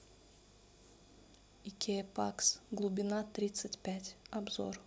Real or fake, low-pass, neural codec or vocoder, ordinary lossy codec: real; none; none; none